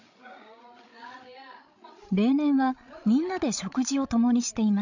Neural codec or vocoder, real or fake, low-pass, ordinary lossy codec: codec, 16 kHz, 16 kbps, FreqCodec, larger model; fake; 7.2 kHz; none